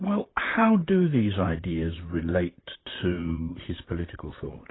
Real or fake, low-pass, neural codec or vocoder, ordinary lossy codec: fake; 7.2 kHz; vocoder, 44.1 kHz, 128 mel bands, Pupu-Vocoder; AAC, 16 kbps